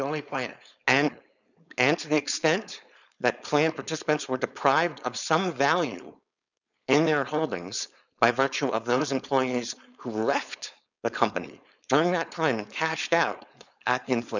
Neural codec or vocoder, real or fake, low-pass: codec, 16 kHz, 4.8 kbps, FACodec; fake; 7.2 kHz